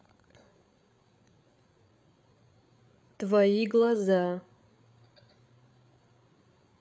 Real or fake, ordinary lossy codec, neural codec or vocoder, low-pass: fake; none; codec, 16 kHz, 8 kbps, FreqCodec, larger model; none